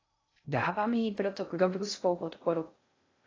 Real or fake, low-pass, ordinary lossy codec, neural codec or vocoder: fake; 7.2 kHz; AAC, 32 kbps; codec, 16 kHz in and 24 kHz out, 0.6 kbps, FocalCodec, streaming, 2048 codes